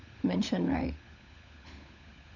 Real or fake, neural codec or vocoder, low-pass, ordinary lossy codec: fake; codec, 16 kHz, 16 kbps, FunCodec, trained on LibriTTS, 50 frames a second; 7.2 kHz; none